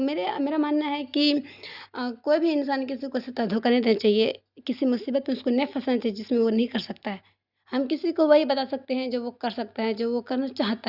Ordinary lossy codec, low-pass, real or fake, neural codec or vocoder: Opus, 64 kbps; 5.4 kHz; real; none